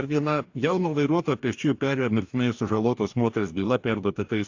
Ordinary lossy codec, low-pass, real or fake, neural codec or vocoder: AAC, 48 kbps; 7.2 kHz; fake; codec, 44.1 kHz, 2.6 kbps, DAC